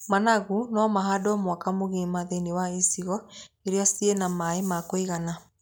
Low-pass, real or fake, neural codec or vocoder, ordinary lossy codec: none; real; none; none